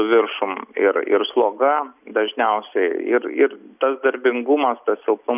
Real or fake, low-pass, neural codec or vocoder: real; 3.6 kHz; none